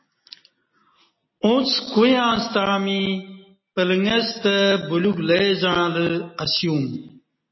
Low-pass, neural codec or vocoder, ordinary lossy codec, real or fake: 7.2 kHz; none; MP3, 24 kbps; real